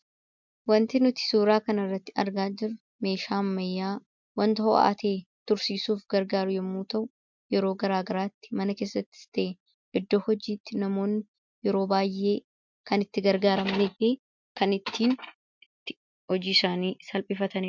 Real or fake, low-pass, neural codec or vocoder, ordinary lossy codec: real; 7.2 kHz; none; MP3, 64 kbps